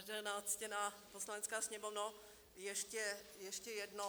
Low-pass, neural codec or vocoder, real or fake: 19.8 kHz; none; real